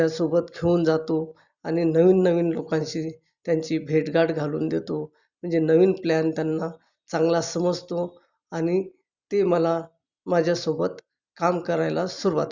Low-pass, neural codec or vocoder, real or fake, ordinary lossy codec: 7.2 kHz; none; real; Opus, 64 kbps